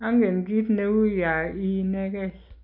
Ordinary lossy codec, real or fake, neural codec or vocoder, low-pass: none; real; none; 5.4 kHz